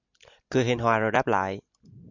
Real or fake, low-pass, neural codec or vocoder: real; 7.2 kHz; none